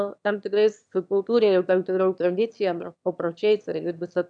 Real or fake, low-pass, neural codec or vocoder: fake; 9.9 kHz; autoencoder, 22.05 kHz, a latent of 192 numbers a frame, VITS, trained on one speaker